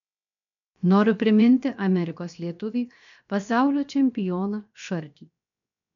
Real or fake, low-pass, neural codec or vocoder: fake; 7.2 kHz; codec, 16 kHz, 0.7 kbps, FocalCodec